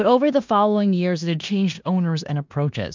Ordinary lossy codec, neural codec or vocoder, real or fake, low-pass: MP3, 64 kbps; codec, 16 kHz in and 24 kHz out, 0.9 kbps, LongCat-Audio-Codec, four codebook decoder; fake; 7.2 kHz